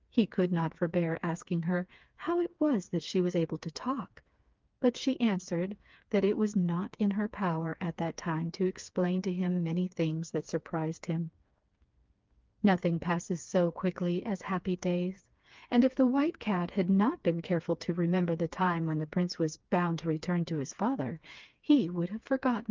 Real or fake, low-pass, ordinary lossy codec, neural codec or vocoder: fake; 7.2 kHz; Opus, 24 kbps; codec, 16 kHz, 4 kbps, FreqCodec, smaller model